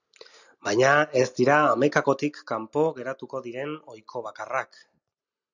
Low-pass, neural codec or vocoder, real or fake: 7.2 kHz; none; real